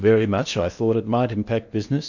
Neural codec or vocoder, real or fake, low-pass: codec, 16 kHz in and 24 kHz out, 0.8 kbps, FocalCodec, streaming, 65536 codes; fake; 7.2 kHz